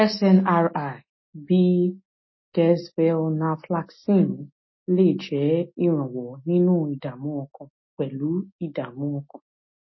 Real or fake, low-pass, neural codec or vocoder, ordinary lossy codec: real; 7.2 kHz; none; MP3, 24 kbps